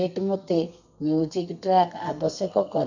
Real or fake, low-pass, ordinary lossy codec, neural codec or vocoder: fake; 7.2 kHz; none; codec, 32 kHz, 1.9 kbps, SNAC